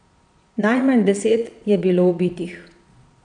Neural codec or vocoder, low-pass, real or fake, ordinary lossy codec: vocoder, 22.05 kHz, 80 mel bands, Vocos; 9.9 kHz; fake; none